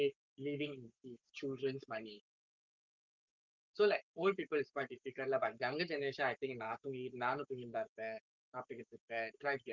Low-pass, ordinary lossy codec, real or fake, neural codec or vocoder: 7.2 kHz; Opus, 32 kbps; fake; codec, 44.1 kHz, 7.8 kbps, Pupu-Codec